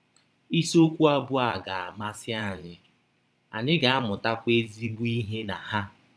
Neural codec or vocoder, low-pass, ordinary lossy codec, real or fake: vocoder, 22.05 kHz, 80 mel bands, Vocos; none; none; fake